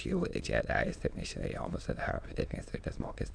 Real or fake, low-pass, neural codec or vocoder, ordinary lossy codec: fake; 9.9 kHz; autoencoder, 22.05 kHz, a latent of 192 numbers a frame, VITS, trained on many speakers; AAC, 64 kbps